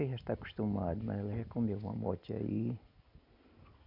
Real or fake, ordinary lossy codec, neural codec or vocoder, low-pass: real; none; none; 5.4 kHz